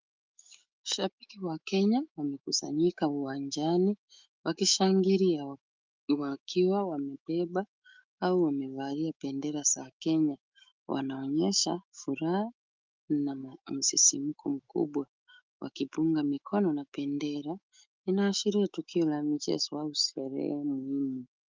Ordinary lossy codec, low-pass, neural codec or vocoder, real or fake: Opus, 32 kbps; 7.2 kHz; autoencoder, 48 kHz, 128 numbers a frame, DAC-VAE, trained on Japanese speech; fake